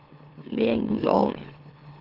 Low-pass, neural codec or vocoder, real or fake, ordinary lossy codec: 5.4 kHz; autoencoder, 44.1 kHz, a latent of 192 numbers a frame, MeloTTS; fake; Opus, 16 kbps